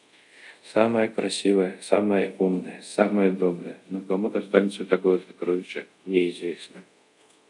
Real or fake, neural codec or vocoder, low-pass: fake; codec, 24 kHz, 0.5 kbps, DualCodec; 10.8 kHz